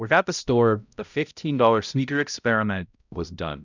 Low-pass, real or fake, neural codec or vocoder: 7.2 kHz; fake; codec, 16 kHz, 0.5 kbps, X-Codec, HuBERT features, trained on balanced general audio